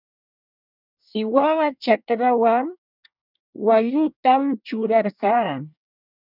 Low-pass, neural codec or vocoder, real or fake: 5.4 kHz; codec, 24 kHz, 1 kbps, SNAC; fake